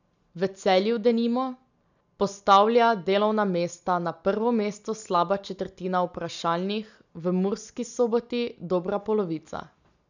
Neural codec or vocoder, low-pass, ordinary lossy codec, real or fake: none; 7.2 kHz; none; real